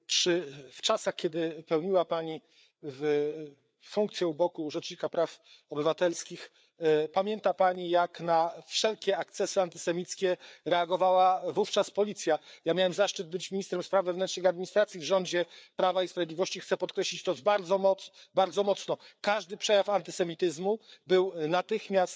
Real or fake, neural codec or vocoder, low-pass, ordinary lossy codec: fake; codec, 16 kHz, 4 kbps, FreqCodec, larger model; none; none